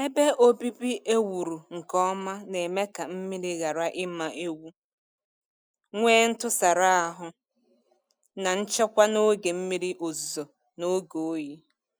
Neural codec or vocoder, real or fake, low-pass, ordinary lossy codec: none; real; none; none